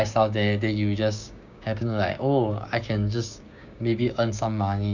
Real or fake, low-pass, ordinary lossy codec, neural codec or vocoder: fake; 7.2 kHz; none; codec, 16 kHz, 6 kbps, DAC